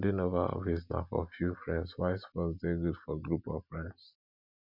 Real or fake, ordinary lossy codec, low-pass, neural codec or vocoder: real; none; 5.4 kHz; none